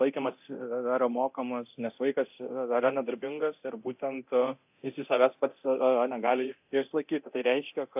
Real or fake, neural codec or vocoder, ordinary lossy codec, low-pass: fake; codec, 24 kHz, 0.9 kbps, DualCodec; AAC, 32 kbps; 3.6 kHz